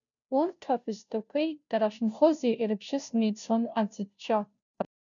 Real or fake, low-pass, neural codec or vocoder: fake; 7.2 kHz; codec, 16 kHz, 0.5 kbps, FunCodec, trained on Chinese and English, 25 frames a second